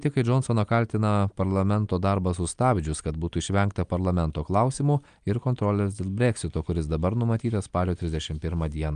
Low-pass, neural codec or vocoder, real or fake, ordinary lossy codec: 9.9 kHz; none; real; Opus, 32 kbps